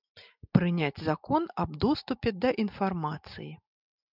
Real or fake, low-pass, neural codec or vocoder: real; 5.4 kHz; none